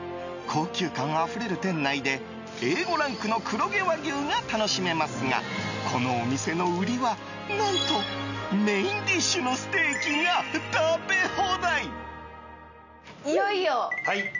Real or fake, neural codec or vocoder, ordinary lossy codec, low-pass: real; none; none; 7.2 kHz